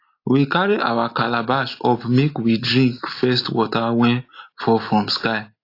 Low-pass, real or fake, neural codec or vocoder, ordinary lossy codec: 5.4 kHz; real; none; AAC, 32 kbps